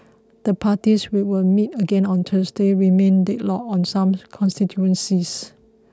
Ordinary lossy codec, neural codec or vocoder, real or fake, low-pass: none; none; real; none